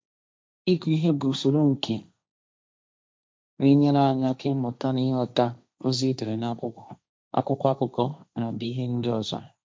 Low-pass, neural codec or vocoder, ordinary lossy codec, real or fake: none; codec, 16 kHz, 1.1 kbps, Voila-Tokenizer; none; fake